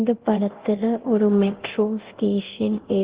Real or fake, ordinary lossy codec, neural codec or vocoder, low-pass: fake; Opus, 16 kbps; codec, 24 kHz, 0.9 kbps, DualCodec; 3.6 kHz